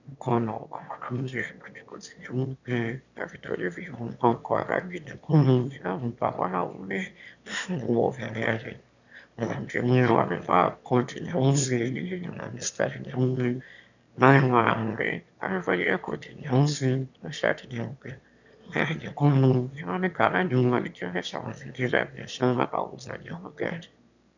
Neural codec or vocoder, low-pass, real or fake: autoencoder, 22.05 kHz, a latent of 192 numbers a frame, VITS, trained on one speaker; 7.2 kHz; fake